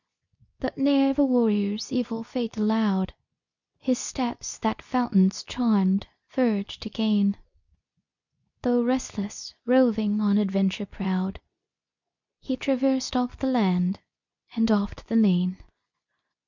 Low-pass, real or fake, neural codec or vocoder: 7.2 kHz; fake; codec, 24 kHz, 0.9 kbps, WavTokenizer, medium speech release version 2